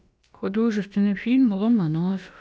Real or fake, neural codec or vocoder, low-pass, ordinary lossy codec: fake; codec, 16 kHz, about 1 kbps, DyCAST, with the encoder's durations; none; none